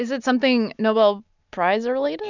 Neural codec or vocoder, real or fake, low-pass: none; real; 7.2 kHz